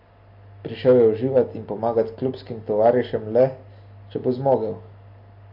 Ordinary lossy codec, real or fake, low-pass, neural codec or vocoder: MP3, 32 kbps; real; 5.4 kHz; none